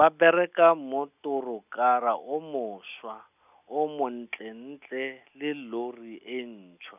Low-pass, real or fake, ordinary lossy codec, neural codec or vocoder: 3.6 kHz; real; none; none